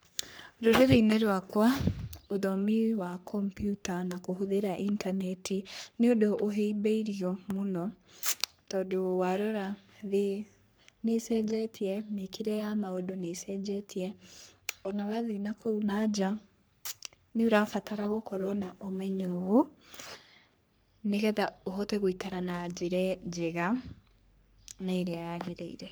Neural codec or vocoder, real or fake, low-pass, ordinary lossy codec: codec, 44.1 kHz, 3.4 kbps, Pupu-Codec; fake; none; none